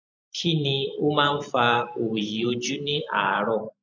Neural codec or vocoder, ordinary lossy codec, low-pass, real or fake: vocoder, 44.1 kHz, 128 mel bands every 512 samples, BigVGAN v2; MP3, 64 kbps; 7.2 kHz; fake